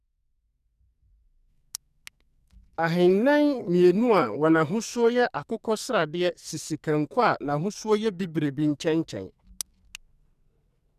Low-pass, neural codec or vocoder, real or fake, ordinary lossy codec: 14.4 kHz; codec, 44.1 kHz, 2.6 kbps, SNAC; fake; none